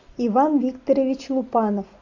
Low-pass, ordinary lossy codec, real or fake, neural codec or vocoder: 7.2 kHz; AAC, 48 kbps; real; none